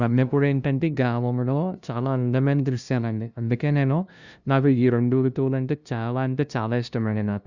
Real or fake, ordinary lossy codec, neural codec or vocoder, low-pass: fake; Opus, 64 kbps; codec, 16 kHz, 0.5 kbps, FunCodec, trained on LibriTTS, 25 frames a second; 7.2 kHz